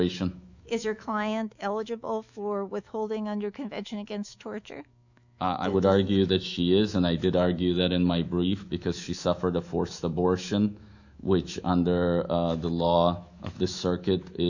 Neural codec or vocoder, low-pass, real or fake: autoencoder, 48 kHz, 128 numbers a frame, DAC-VAE, trained on Japanese speech; 7.2 kHz; fake